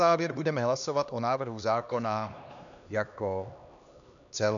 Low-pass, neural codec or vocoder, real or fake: 7.2 kHz; codec, 16 kHz, 2 kbps, X-Codec, HuBERT features, trained on LibriSpeech; fake